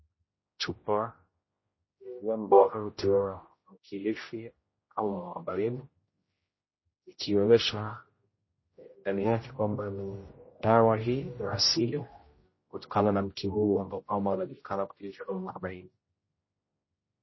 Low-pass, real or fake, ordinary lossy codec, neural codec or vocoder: 7.2 kHz; fake; MP3, 24 kbps; codec, 16 kHz, 0.5 kbps, X-Codec, HuBERT features, trained on general audio